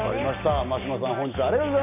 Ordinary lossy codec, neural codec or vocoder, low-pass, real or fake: none; none; 3.6 kHz; real